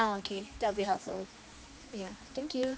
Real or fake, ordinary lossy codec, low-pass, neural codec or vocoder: fake; none; none; codec, 16 kHz, 2 kbps, X-Codec, HuBERT features, trained on general audio